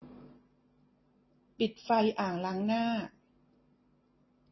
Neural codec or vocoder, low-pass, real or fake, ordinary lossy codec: none; 7.2 kHz; real; MP3, 24 kbps